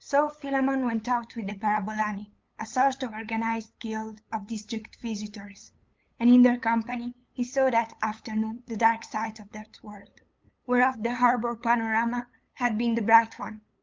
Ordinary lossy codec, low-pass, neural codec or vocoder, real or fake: Opus, 24 kbps; 7.2 kHz; codec, 16 kHz, 8 kbps, FunCodec, trained on LibriTTS, 25 frames a second; fake